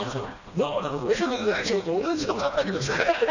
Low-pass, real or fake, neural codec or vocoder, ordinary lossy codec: 7.2 kHz; fake; codec, 16 kHz, 1 kbps, FreqCodec, smaller model; none